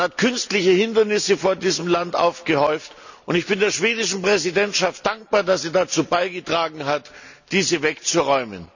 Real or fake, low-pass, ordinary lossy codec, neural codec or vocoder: real; 7.2 kHz; none; none